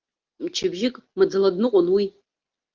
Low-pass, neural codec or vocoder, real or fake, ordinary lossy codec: 7.2 kHz; none; real; Opus, 32 kbps